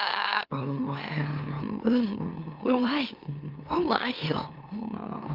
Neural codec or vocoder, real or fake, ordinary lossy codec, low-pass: autoencoder, 44.1 kHz, a latent of 192 numbers a frame, MeloTTS; fake; Opus, 24 kbps; 5.4 kHz